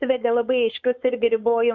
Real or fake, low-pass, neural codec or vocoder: fake; 7.2 kHz; codec, 16 kHz, 4.8 kbps, FACodec